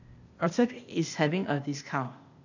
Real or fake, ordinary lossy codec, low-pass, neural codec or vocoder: fake; none; 7.2 kHz; codec, 16 kHz, 0.8 kbps, ZipCodec